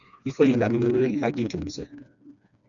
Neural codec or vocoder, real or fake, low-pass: codec, 16 kHz, 2 kbps, FreqCodec, smaller model; fake; 7.2 kHz